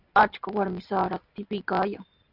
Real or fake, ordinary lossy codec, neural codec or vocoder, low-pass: real; AAC, 48 kbps; none; 5.4 kHz